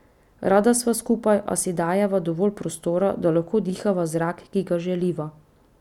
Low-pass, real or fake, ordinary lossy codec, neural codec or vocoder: 19.8 kHz; real; none; none